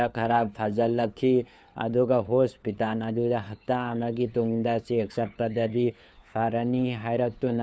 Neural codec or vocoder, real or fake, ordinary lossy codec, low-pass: codec, 16 kHz, 4 kbps, FunCodec, trained on LibriTTS, 50 frames a second; fake; none; none